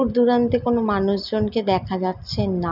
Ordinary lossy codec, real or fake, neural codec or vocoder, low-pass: none; real; none; 5.4 kHz